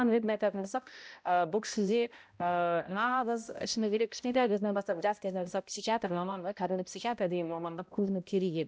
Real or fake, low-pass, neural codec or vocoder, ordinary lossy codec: fake; none; codec, 16 kHz, 0.5 kbps, X-Codec, HuBERT features, trained on balanced general audio; none